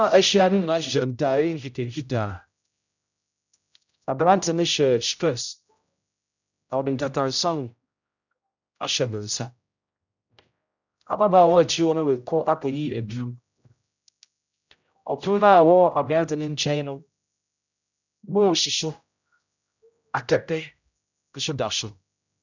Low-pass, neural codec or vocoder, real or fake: 7.2 kHz; codec, 16 kHz, 0.5 kbps, X-Codec, HuBERT features, trained on general audio; fake